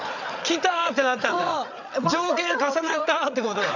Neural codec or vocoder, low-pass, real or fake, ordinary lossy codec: codec, 16 kHz, 8 kbps, FreqCodec, larger model; 7.2 kHz; fake; none